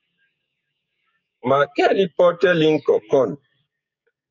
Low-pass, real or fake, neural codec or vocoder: 7.2 kHz; fake; codec, 44.1 kHz, 7.8 kbps, DAC